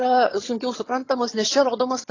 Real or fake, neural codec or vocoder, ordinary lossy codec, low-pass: fake; vocoder, 22.05 kHz, 80 mel bands, HiFi-GAN; AAC, 32 kbps; 7.2 kHz